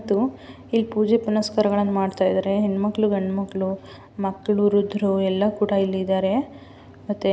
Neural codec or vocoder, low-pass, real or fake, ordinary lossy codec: none; none; real; none